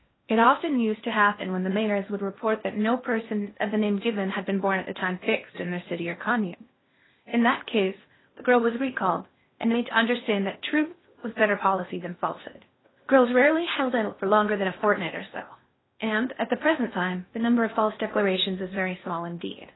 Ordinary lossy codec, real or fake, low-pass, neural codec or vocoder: AAC, 16 kbps; fake; 7.2 kHz; codec, 16 kHz in and 24 kHz out, 0.8 kbps, FocalCodec, streaming, 65536 codes